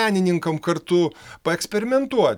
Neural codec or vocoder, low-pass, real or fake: none; 19.8 kHz; real